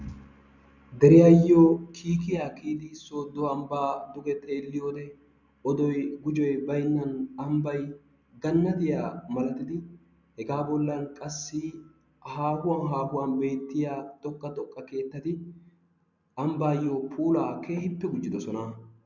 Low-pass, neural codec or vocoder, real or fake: 7.2 kHz; none; real